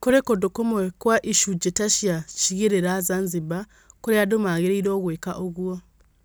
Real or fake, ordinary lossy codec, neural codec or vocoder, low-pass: real; none; none; none